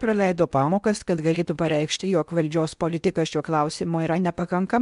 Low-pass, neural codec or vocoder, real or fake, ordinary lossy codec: 10.8 kHz; codec, 16 kHz in and 24 kHz out, 0.8 kbps, FocalCodec, streaming, 65536 codes; fake; MP3, 96 kbps